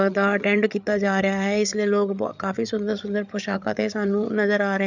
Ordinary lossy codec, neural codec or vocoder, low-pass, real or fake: none; codec, 16 kHz, 8 kbps, FreqCodec, larger model; 7.2 kHz; fake